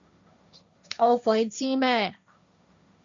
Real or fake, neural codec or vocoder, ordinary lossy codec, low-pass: fake; codec, 16 kHz, 1.1 kbps, Voila-Tokenizer; MP3, 64 kbps; 7.2 kHz